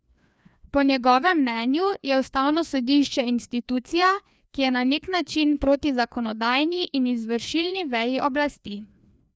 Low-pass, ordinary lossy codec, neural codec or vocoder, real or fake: none; none; codec, 16 kHz, 2 kbps, FreqCodec, larger model; fake